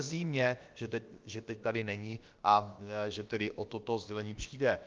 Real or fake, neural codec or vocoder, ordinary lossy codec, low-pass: fake; codec, 16 kHz, about 1 kbps, DyCAST, with the encoder's durations; Opus, 32 kbps; 7.2 kHz